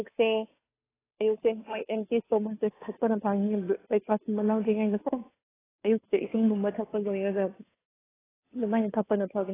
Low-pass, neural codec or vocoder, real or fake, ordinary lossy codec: 3.6 kHz; codec, 16 kHz, 2 kbps, FunCodec, trained on Chinese and English, 25 frames a second; fake; AAC, 16 kbps